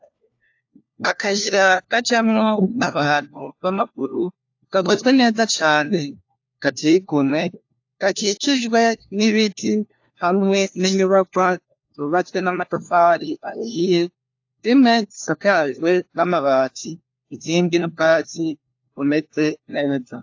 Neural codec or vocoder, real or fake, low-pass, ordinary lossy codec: codec, 16 kHz, 1 kbps, FunCodec, trained on LibriTTS, 50 frames a second; fake; 7.2 kHz; AAC, 48 kbps